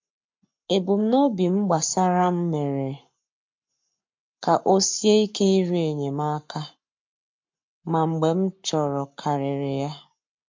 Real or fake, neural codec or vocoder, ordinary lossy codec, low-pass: fake; codec, 44.1 kHz, 7.8 kbps, Pupu-Codec; MP3, 48 kbps; 7.2 kHz